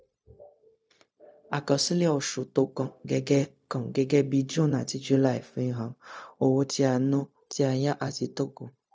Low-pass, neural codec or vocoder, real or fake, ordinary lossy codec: none; codec, 16 kHz, 0.4 kbps, LongCat-Audio-Codec; fake; none